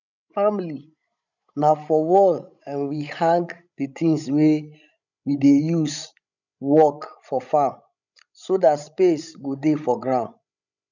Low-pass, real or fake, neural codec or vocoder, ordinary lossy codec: 7.2 kHz; fake; codec, 16 kHz, 16 kbps, FreqCodec, larger model; none